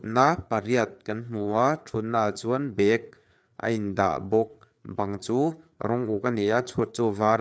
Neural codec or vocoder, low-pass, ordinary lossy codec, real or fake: codec, 16 kHz, 4 kbps, FreqCodec, larger model; none; none; fake